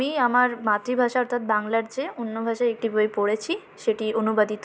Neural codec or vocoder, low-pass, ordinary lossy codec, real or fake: none; none; none; real